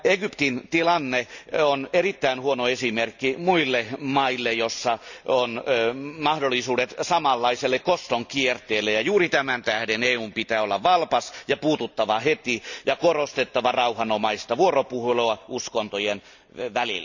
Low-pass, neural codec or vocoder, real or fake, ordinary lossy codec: 7.2 kHz; none; real; none